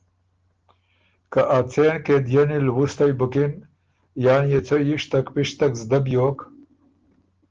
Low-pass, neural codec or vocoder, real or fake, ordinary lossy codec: 7.2 kHz; none; real; Opus, 16 kbps